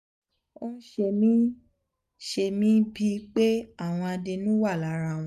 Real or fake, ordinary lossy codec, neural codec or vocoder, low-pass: real; none; none; 14.4 kHz